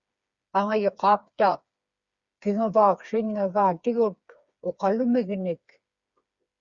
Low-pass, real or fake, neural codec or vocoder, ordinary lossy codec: 7.2 kHz; fake; codec, 16 kHz, 4 kbps, FreqCodec, smaller model; Opus, 64 kbps